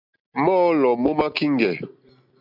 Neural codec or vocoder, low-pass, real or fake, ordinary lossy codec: none; 5.4 kHz; real; MP3, 48 kbps